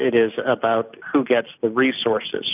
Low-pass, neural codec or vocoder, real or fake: 3.6 kHz; none; real